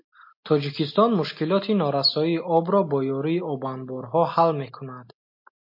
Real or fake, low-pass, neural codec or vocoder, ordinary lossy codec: real; 5.4 kHz; none; MP3, 32 kbps